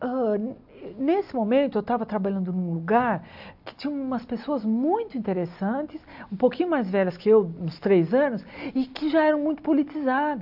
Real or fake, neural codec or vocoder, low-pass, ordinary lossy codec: real; none; 5.4 kHz; AAC, 48 kbps